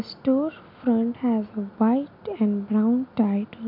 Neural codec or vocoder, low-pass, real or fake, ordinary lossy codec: none; 5.4 kHz; real; MP3, 48 kbps